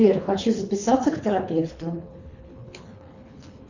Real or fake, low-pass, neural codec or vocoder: fake; 7.2 kHz; codec, 24 kHz, 3 kbps, HILCodec